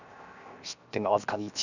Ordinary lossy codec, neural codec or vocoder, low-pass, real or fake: none; codec, 16 kHz, 0.7 kbps, FocalCodec; 7.2 kHz; fake